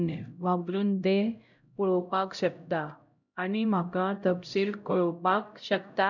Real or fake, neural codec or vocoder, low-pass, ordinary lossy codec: fake; codec, 16 kHz, 0.5 kbps, X-Codec, HuBERT features, trained on LibriSpeech; 7.2 kHz; none